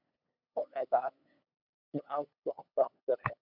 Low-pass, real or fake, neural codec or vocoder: 5.4 kHz; fake; codec, 16 kHz, 8 kbps, FunCodec, trained on LibriTTS, 25 frames a second